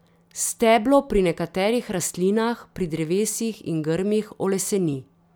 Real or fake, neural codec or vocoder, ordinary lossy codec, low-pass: real; none; none; none